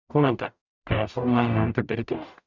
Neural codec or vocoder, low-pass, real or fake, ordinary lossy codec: codec, 44.1 kHz, 0.9 kbps, DAC; 7.2 kHz; fake; none